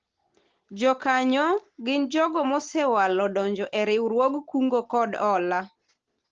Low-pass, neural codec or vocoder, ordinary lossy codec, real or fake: 7.2 kHz; none; Opus, 16 kbps; real